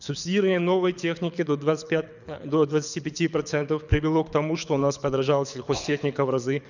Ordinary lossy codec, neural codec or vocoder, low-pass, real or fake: none; codec, 24 kHz, 6 kbps, HILCodec; 7.2 kHz; fake